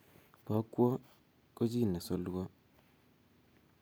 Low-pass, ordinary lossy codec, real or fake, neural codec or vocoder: none; none; real; none